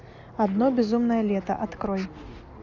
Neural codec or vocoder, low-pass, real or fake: none; 7.2 kHz; real